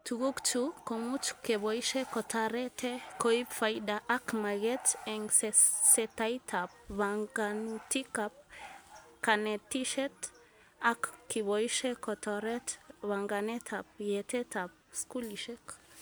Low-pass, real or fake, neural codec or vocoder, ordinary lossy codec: none; real; none; none